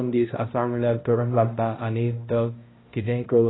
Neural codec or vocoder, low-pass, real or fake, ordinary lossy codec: codec, 16 kHz, 0.5 kbps, X-Codec, HuBERT features, trained on balanced general audio; 7.2 kHz; fake; AAC, 16 kbps